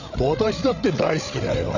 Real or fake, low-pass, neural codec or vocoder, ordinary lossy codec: fake; 7.2 kHz; codec, 16 kHz, 16 kbps, FreqCodec, larger model; none